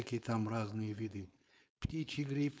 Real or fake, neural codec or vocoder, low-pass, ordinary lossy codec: fake; codec, 16 kHz, 4.8 kbps, FACodec; none; none